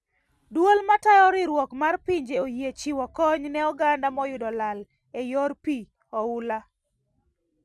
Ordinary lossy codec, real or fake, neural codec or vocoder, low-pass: none; real; none; none